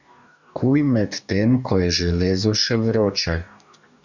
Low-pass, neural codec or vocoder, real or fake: 7.2 kHz; codec, 44.1 kHz, 2.6 kbps, DAC; fake